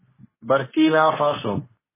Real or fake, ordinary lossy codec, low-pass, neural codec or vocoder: fake; MP3, 16 kbps; 3.6 kHz; codec, 24 kHz, 0.9 kbps, WavTokenizer, medium speech release version 2